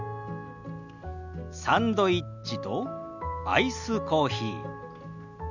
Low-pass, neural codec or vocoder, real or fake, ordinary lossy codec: 7.2 kHz; none; real; none